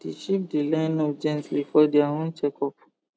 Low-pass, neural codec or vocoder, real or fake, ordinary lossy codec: none; none; real; none